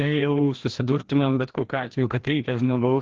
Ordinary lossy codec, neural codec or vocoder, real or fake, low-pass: Opus, 16 kbps; codec, 16 kHz, 1 kbps, FreqCodec, larger model; fake; 7.2 kHz